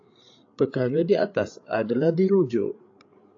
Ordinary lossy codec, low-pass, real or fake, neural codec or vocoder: AAC, 48 kbps; 7.2 kHz; fake; codec, 16 kHz, 8 kbps, FreqCodec, larger model